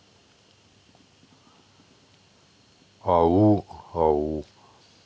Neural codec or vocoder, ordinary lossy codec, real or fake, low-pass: none; none; real; none